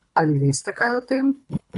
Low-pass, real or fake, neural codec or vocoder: 10.8 kHz; fake; codec, 24 kHz, 3 kbps, HILCodec